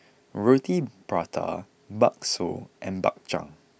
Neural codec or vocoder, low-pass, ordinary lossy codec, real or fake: none; none; none; real